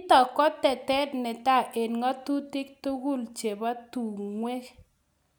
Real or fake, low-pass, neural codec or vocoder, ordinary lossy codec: real; none; none; none